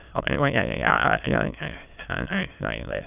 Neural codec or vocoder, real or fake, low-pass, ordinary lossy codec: autoencoder, 22.05 kHz, a latent of 192 numbers a frame, VITS, trained on many speakers; fake; 3.6 kHz; none